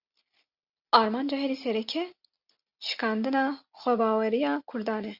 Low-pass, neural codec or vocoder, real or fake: 5.4 kHz; none; real